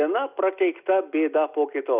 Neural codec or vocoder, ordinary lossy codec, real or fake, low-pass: none; Opus, 64 kbps; real; 3.6 kHz